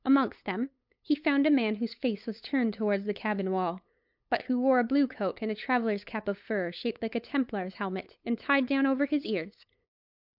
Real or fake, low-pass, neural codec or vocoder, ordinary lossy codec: fake; 5.4 kHz; codec, 16 kHz, 8 kbps, FunCodec, trained on LibriTTS, 25 frames a second; MP3, 48 kbps